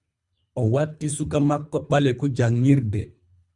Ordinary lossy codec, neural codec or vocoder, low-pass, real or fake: Opus, 64 kbps; codec, 24 kHz, 3 kbps, HILCodec; 10.8 kHz; fake